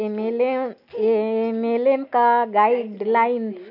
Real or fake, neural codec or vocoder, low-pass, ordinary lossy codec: real; none; 5.4 kHz; none